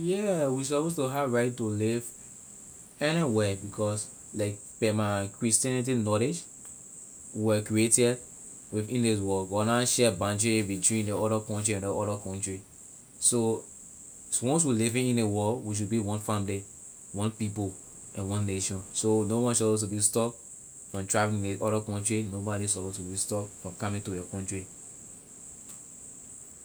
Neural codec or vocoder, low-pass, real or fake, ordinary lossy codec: none; none; real; none